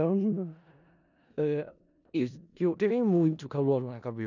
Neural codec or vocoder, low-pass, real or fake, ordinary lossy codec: codec, 16 kHz in and 24 kHz out, 0.4 kbps, LongCat-Audio-Codec, four codebook decoder; 7.2 kHz; fake; none